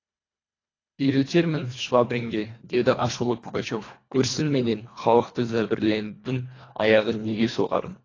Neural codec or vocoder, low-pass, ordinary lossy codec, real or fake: codec, 24 kHz, 1.5 kbps, HILCodec; 7.2 kHz; AAC, 32 kbps; fake